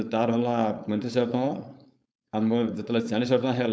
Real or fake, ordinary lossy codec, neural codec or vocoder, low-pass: fake; none; codec, 16 kHz, 4.8 kbps, FACodec; none